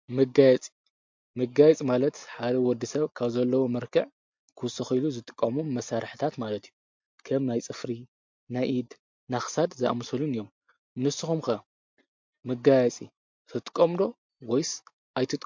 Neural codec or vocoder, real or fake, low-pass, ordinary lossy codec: none; real; 7.2 kHz; MP3, 48 kbps